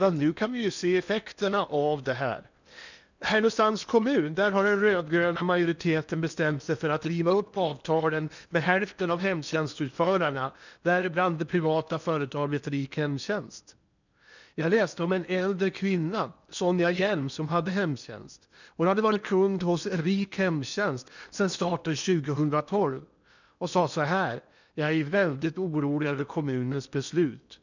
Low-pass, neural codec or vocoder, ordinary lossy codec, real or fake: 7.2 kHz; codec, 16 kHz in and 24 kHz out, 0.8 kbps, FocalCodec, streaming, 65536 codes; none; fake